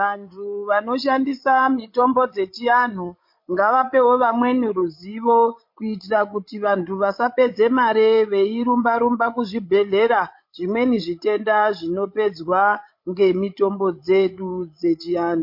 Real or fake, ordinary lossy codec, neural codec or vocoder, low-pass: fake; MP3, 32 kbps; codec, 16 kHz, 16 kbps, FreqCodec, larger model; 5.4 kHz